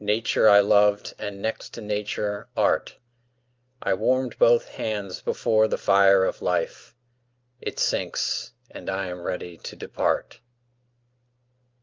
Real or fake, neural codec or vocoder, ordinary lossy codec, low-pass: real; none; Opus, 24 kbps; 7.2 kHz